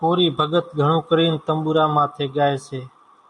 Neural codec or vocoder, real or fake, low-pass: none; real; 10.8 kHz